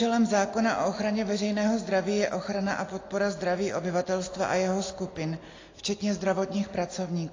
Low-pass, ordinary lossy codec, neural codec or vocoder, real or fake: 7.2 kHz; AAC, 32 kbps; none; real